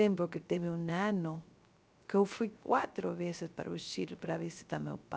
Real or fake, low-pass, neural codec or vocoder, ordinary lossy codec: fake; none; codec, 16 kHz, 0.3 kbps, FocalCodec; none